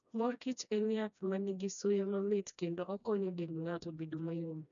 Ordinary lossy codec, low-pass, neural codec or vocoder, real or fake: none; 7.2 kHz; codec, 16 kHz, 1 kbps, FreqCodec, smaller model; fake